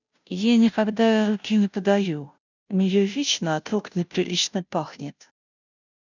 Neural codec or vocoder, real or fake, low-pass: codec, 16 kHz, 0.5 kbps, FunCodec, trained on Chinese and English, 25 frames a second; fake; 7.2 kHz